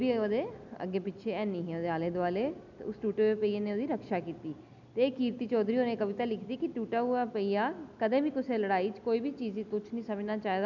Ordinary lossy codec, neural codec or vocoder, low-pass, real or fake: none; none; 7.2 kHz; real